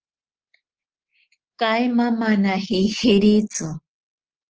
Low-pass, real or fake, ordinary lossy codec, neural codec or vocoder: 7.2 kHz; fake; Opus, 16 kbps; codec, 24 kHz, 3.1 kbps, DualCodec